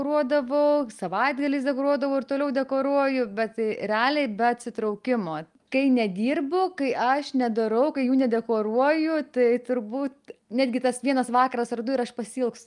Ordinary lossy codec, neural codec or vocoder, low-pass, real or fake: Opus, 32 kbps; none; 10.8 kHz; real